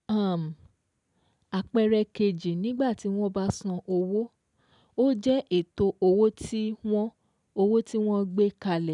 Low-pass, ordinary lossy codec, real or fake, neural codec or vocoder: 10.8 kHz; none; real; none